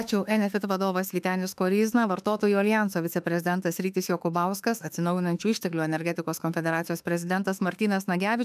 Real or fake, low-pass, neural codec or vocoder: fake; 14.4 kHz; autoencoder, 48 kHz, 32 numbers a frame, DAC-VAE, trained on Japanese speech